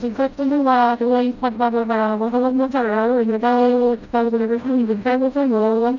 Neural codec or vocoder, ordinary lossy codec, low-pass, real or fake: codec, 16 kHz, 0.5 kbps, FreqCodec, smaller model; none; 7.2 kHz; fake